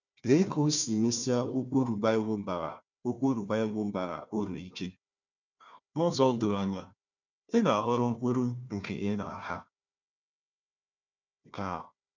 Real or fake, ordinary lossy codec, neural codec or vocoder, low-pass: fake; none; codec, 16 kHz, 1 kbps, FunCodec, trained on Chinese and English, 50 frames a second; 7.2 kHz